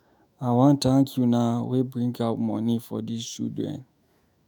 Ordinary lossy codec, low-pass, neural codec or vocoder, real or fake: none; none; autoencoder, 48 kHz, 128 numbers a frame, DAC-VAE, trained on Japanese speech; fake